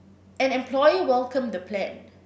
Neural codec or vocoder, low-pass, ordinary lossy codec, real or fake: none; none; none; real